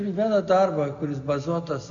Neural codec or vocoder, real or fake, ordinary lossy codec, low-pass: none; real; MP3, 96 kbps; 7.2 kHz